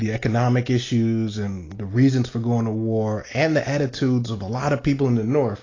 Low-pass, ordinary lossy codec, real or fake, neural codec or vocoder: 7.2 kHz; AAC, 32 kbps; real; none